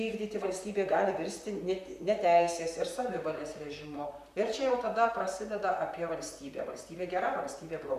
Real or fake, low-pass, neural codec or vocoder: fake; 14.4 kHz; vocoder, 44.1 kHz, 128 mel bands, Pupu-Vocoder